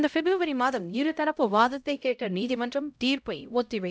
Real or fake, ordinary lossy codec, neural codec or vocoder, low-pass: fake; none; codec, 16 kHz, 0.5 kbps, X-Codec, HuBERT features, trained on LibriSpeech; none